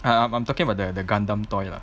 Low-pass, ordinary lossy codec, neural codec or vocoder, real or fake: none; none; none; real